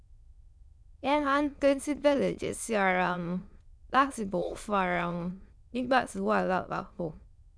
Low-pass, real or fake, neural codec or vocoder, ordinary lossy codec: none; fake; autoencoder, 22.05 kHz, a latent of 192 numbers a frame, VITS, trained on many speakers; none